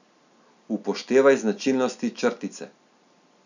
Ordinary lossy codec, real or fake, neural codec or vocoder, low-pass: none; real; none; 7.2 kHz